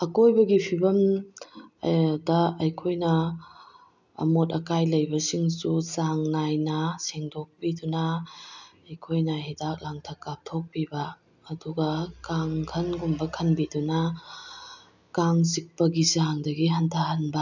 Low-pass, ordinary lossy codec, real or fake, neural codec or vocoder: 7.2 kHz; none; real; none